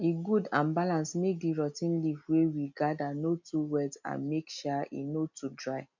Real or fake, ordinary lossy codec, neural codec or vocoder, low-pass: real; none; none; 7.2 kHz